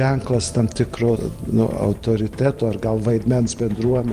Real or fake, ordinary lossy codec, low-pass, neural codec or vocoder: fake; Opus, 32 kbps; 14.4 kHz; vocoder, 44.1 kHz, 128 mel bands every 512 samples, BigVGAN v2